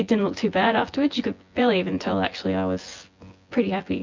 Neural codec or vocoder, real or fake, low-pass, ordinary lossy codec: vocoder, 24 kHz, 100 mel bands, Vocos; fake; 7.2 kHz; MP3, 64 kbps